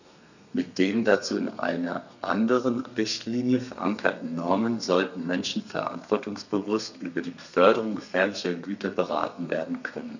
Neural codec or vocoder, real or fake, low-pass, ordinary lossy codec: codec, 32 kHz, 1.9 kbps, SNAC; fake; 7.2 kHz; none